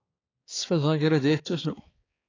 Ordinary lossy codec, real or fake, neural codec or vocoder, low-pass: AAC, 32 kbps; fake; codec, 16 kHz, 4 kbps, X-Codec, HuBERT features, trained on balanced general audio; 7.2 kHz